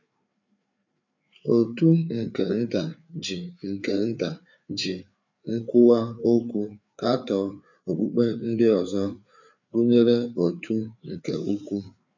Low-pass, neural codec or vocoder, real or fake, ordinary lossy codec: 7.2 kHz; codec, 16 kHz, 4 kbps, FreqCodec, larger model; fake; none